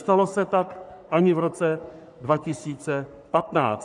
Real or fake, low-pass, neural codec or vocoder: fake; 10.8 kHz; codec, 44.1 kHz, 3.4 kbps, Pupu-Codec